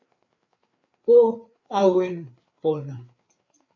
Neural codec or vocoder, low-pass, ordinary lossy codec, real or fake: codec, 16 kHz, 4 kbps, FreqCodec, larger model; 7.2 kHz; MP3, 48 kbps; fake